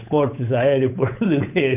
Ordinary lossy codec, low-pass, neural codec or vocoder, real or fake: none; 3.6 kHz; codec, 16 kHz, 8 kbps, FunCodec, trained on Chinese and English, 25 frames a second; fake